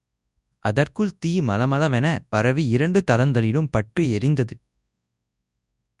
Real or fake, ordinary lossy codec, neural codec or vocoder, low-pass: fake; none; codec, 24 kHz, 0.9 kbps, WavTokenizer, large speech release; 10.8 kHz